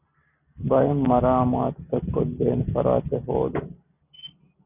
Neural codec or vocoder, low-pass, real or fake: none; 3.6 kHz; real